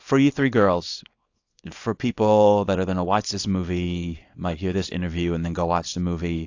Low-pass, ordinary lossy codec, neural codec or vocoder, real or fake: 7.2 kHz; AAC, 48 kbps; codec, 24 kHz, 0.9 kbps, WavTokenizer, small release; fake